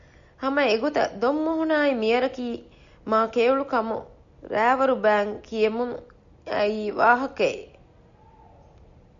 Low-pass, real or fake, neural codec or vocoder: 7.2 kHz; real; none